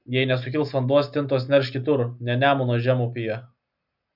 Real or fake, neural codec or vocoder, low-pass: real; none; 5.4 kHz